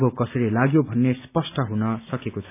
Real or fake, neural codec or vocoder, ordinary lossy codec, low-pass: real; none; none; 3.6 kHz